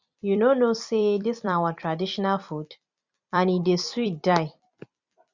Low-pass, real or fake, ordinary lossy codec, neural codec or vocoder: 7.2 kHz; fake; Opus, 64 kbps; vocoder, 44.1 kHz, 128 mel bands every 256 samples, BigVGAN v2